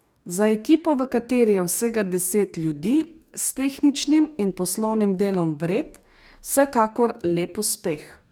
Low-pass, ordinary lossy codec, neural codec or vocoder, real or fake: none; none; codec, 44.1 kHz, 2.6 kbps, DAC; fake